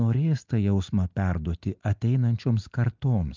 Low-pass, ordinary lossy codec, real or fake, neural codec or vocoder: 7.2 kHz; Opus, 32 kbps; real; none